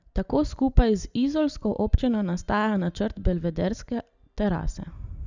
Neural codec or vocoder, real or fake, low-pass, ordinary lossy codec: none; real; 7.2 kHz; Opus, 64 kbps